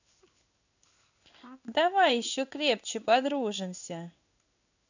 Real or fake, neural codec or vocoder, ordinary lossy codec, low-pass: fake; codec, 16 kHz in and 24 kHz out, 1 kbps, XY-Tokenizer; none; 7.2 kHz